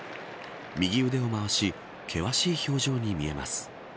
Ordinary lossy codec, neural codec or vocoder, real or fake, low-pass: none; none; real; none